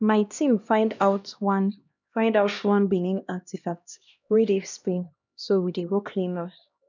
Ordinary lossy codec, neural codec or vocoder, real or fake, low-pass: none; codec, 16 kHz, 1 kbps, X-Codec, HuBERT features, trained on LibriSpeech; fake; 7.2 kHz